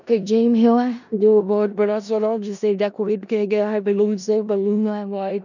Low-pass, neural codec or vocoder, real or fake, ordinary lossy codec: 7.2 kHz; codec, 16 kHz in and 24 kHz out, 0.4 kbps, LongCat-Audio-Codec, four codebook decoder; fake; none